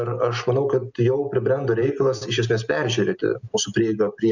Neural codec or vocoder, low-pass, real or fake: none; 7.2 kHz; real